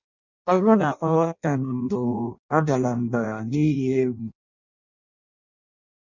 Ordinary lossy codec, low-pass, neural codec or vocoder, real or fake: none; 7.2 kHz; codec, 16 kHz in and 24 kHz out, 0.6 kbps, FireRedTTS-2 codec; fake